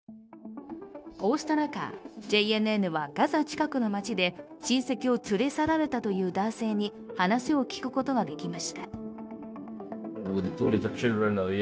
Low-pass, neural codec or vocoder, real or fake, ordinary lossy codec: none; codec, 16 kHz, 0.9 kbps, LongCat-Audio-Codec; fake; none